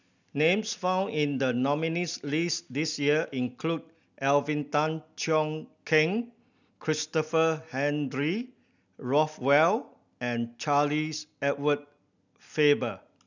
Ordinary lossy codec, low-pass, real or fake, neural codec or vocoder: none; 7.2 kHz; real; none